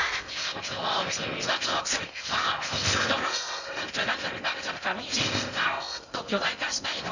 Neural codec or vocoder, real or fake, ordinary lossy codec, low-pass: codec, 16 kHz in and 24 kHz out, 0.6 kbps, FocalCodec, streaming, 2048 codes; fake; none; 7.2 kHz